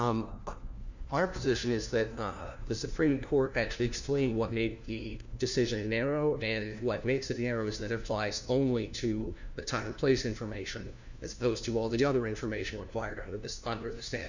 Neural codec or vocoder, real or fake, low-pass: codec, 16 kHz, 1 kbps, FunCodec, trained on LibriTTS, 50 frames a second; fake; 7.2 kHz